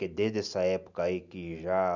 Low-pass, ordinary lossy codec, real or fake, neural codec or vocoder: 7.2 kHz; none; real; none